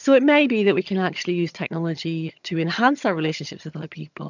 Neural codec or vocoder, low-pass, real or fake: vocoder, 22.05 kHz, 80 mel bands, HiFi-GAN; 7.2 kHz; fake